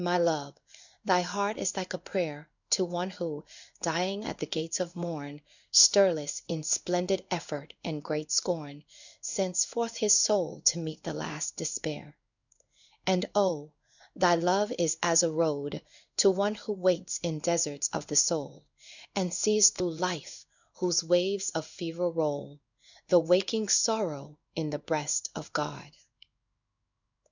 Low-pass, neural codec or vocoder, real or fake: 7.2 kHz; codec, 16 kHz in and 24 kHz out, 1 kbps, XY-Tokenizer; fake